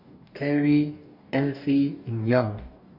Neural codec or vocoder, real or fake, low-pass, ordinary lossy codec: codec, 44.1 kHz, 2.6 kbps, DAC; fake; 5.4 kHz; none